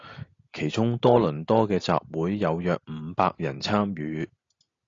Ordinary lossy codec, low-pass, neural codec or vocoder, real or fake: AAC, 32 kbps; 7.2 kHz; none; real